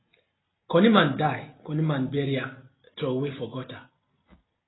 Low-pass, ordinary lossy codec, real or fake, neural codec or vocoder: 7.2 kHz; AAC, 16 kbps; real; none